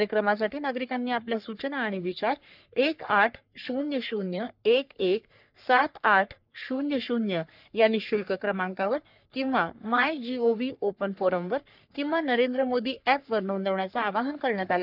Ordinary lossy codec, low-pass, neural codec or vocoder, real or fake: none; 5.4 kHz; codec, 44.1 kHz, 3.4 kbps, Pupu-Codec; fake